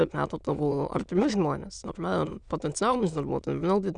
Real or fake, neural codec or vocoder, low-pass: fake; autoencoder, 22.05 kHz, a latent of 192 numbers a frame, VITS, trained on many speakers; 9.9 kHz